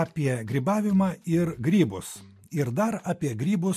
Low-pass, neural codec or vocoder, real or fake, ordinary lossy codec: 14.4 kHz; none; real; MP3, 64 kbps